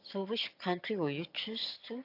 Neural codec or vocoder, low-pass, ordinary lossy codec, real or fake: vocoder, 22.05 kHz, 80 mel bands, HiFi-GAN; 5.4 kHz; AAC, 32 kbps; fake